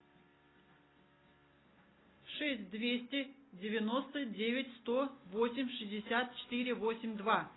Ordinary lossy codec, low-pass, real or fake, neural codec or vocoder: AAC, 16 kbps; 7.2 kHz; real; none